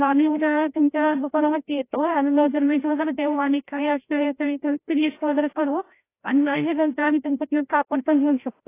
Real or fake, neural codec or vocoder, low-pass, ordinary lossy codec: fake; codec, 16 kHz, 0.5 kbps, FreqCodec, larger model; 3.6 kHz; AAC, 24 kbps